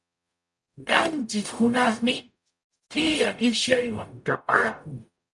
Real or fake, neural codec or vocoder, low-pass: fake; codec, 44.1 kHz, 0.9 kbps, DAC; 10.8 kHz